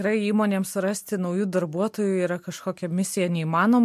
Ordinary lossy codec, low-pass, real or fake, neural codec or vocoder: MP3, 64 kbps; 14.4 kHz; real; none